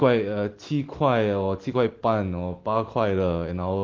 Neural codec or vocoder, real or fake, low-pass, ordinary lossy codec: none; real; 7.2 kHz; Opus, 16 kbps